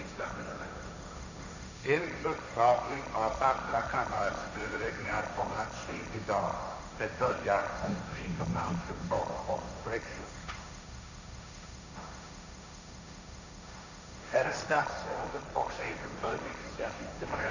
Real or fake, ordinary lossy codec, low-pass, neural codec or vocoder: fake; none; 7.2 kHz; codec, 16 kHz, 1.1 kbps, Voila-Tokenizer